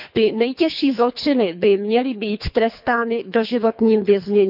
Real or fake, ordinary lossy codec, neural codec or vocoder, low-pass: fake; none; codec, 24 kHz, 3 kbps, HILCodec; 5.4 kHz